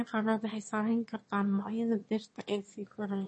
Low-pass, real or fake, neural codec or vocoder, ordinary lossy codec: 9.9 kHz; fake; autoencoder, 22.05 kHz, a latent of 192 numbers a frame, VITS, trained on one speaker; MP3, 32 kbps